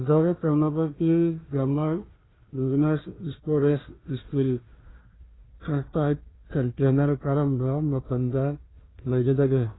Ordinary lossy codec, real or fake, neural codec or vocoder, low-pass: AAC, 16 kbps; fake; codec, 16 kHz, 1 kbps, FunCodec, trained on Chinese and English, 50 frames a second; 7.2 kHz